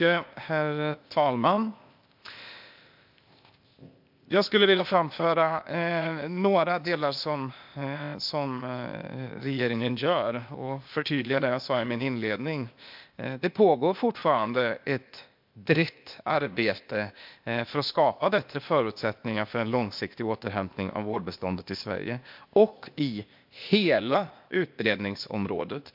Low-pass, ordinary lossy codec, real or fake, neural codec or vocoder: 5.4 kHz; MP3, 48 kbps; fake; codec, 16 kHz, 0.8 kbps, ZipCodec